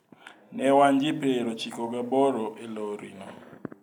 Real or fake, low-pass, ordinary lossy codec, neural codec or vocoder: real; 19.8 kHz; none; none